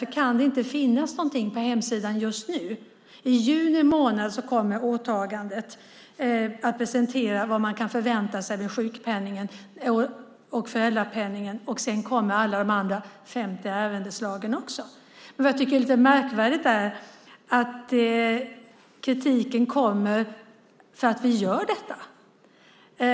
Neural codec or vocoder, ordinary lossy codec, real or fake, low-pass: none; none; real; none